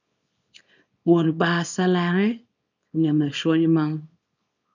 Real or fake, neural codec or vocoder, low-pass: fake; codec, 24 kHz, 0.9 kbps, WavTokenizer, small release; 7.2 kHz